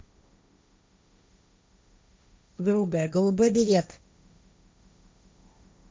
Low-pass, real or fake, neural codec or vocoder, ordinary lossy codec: none; fake; codec, 16 kHz, 1.1 kbps, Voila-Tokenizer; none